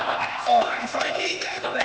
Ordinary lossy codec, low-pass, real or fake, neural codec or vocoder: none; none; fake; codec, 16 kHz, 0.8 kbps, ZipCodec